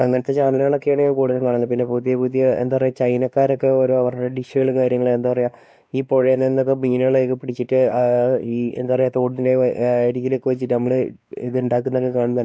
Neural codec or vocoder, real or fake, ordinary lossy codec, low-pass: codec, 16 kHz, 2 kbps, X-Codec, WavLM features, trained on Multilingual LibriSpeech; fake; none; none